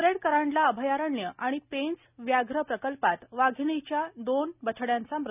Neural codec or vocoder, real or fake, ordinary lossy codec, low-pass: none; real; none; 3.6 kHz